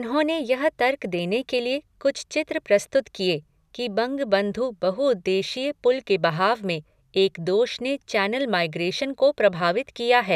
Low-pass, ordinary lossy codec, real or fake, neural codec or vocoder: 14.4 kHz; none; real; none